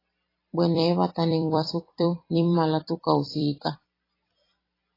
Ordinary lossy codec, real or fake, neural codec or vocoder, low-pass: AAC, 24 kbps; fake; vocoder, 44.1 kHz, 128 mel bands every 256 samples, BigVGAN v2; 5.4 kHz